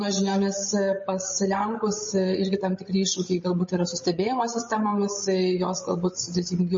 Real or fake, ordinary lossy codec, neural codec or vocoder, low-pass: fake; MP3, 32 kbps; codec, 16 kHz, 16 kbps, FreqCodec, larger model; 7.2 kHz